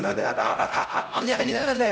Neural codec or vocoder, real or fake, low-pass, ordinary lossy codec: codec, 16 kHz, 0.5 kbps, X-Codec, HuBERT features, trained on LibriSpeech; fake; none; none